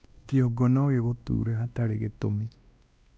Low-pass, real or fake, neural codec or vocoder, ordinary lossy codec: none; fake; codec, 16 kHz, 1 kbps, X-Codec, WavLM features, trained on Multilingual LibriSpeech; none